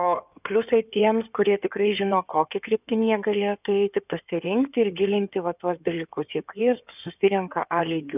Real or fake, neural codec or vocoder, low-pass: fake; codec, 16 kHz in and 24 kHz out, 2.2 kbps, FireRedTTS-2 codec; 3.6 kHz